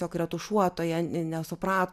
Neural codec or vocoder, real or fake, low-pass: none; real; 14.4 kHz